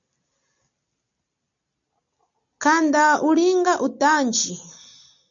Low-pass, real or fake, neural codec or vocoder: 7.2 kHz; real; none